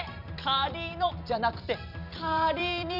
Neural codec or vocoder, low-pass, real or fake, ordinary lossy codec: vocoder, 44.1 kHz, 128 mel bands every 256 samples, BigVGAN v2; 5.4 kHz; fake; none